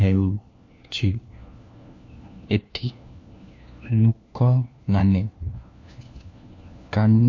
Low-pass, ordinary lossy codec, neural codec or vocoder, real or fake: 7.2 kHz; MP3, 48 kbps; codec, 16 kHz, 1 kbps, FunCodec, trained on LibriTTS, 50 frames a second; fake